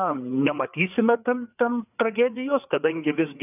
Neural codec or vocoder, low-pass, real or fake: codec, 16 kHz, 4 kbps, FreqCodec, larger model; 3.6 kHz; fake